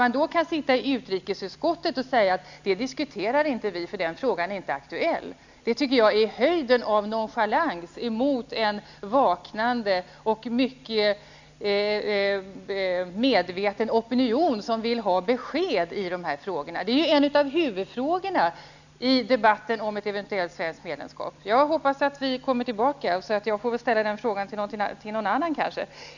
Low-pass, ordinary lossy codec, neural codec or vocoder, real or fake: 7.2 kHz; none; none; real